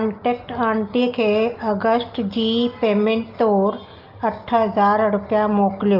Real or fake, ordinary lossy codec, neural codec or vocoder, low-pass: real; Opus, 24 kbps; none; 5.4 kHz